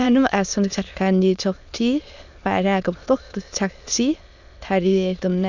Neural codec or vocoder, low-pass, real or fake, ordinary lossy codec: autoencoder, 22.05 kHz, a latent of 192 numbers a frame, VITS, trained on many speakers; 7.2 kHz; fake; none